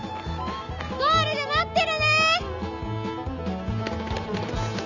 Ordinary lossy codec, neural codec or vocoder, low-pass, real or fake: none; none; 7.2 kHz; real